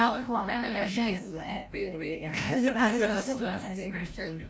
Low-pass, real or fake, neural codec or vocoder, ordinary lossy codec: none; fake; codec, 16 kHz, 0.5 kbps, FreqCodec, larger model; none